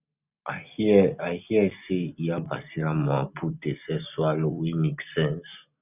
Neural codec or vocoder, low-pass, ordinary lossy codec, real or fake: none; 3.6 kHz; none; real